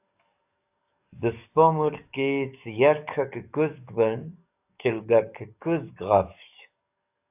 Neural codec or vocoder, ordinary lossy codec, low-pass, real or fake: codec, 44.1 kHz, 7.8 kbps, DAC; AAC, 32 kbps; 3.6 kHz; fake